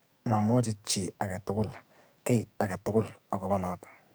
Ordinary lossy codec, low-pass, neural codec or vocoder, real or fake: none; none; codec, 44.1 kHz, 2.6 kbps, SNAC; fake